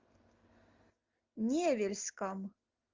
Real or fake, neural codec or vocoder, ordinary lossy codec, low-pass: real; none; Opus, 32 kbps; 7.2 kHz